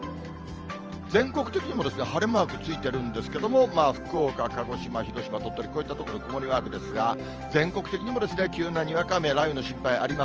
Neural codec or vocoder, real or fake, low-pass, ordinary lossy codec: vocoder, 44.1 kHz, 128 mel bands every 512 samples, BigVGAN v2; fake; 7.2 kHz; Opus, 24 kbps